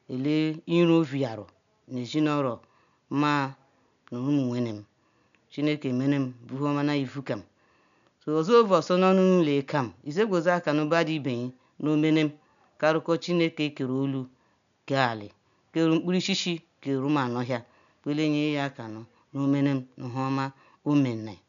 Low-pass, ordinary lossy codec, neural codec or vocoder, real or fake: 7.2 kHz; none; none; real